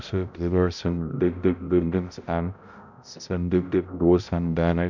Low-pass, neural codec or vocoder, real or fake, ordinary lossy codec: 7.2 kHz; codec, 16 kHz, 0.5 kbps, X-Codec, HuBERT features, trained on balanced general audio; fake; none